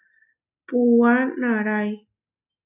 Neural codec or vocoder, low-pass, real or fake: none; 3.6 kHz; real